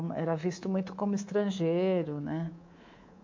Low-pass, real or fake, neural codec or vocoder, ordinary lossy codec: 7.2 kHz; fake; codec, 24 kHz, 3.1 kbps, DualCodec; MP3, 48 kbps